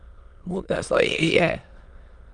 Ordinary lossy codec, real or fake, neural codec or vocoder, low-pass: Opus, 32 kbps; fake; autoencoder, 22.05 kHz, a latent of 192 numbers a frame, VITS, trained on many speakers; 9.9 kHz